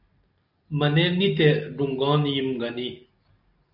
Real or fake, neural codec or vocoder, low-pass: real; none; 5.4 kHz